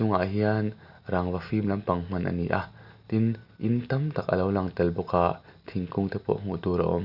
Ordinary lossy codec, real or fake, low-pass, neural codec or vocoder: none; real; 5.4 kHz; none